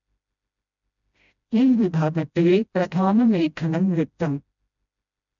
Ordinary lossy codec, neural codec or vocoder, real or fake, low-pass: none; codec, 16 kHz, 0.5 kbps, FreqCodec, smaller model; fake; 7.2 kHz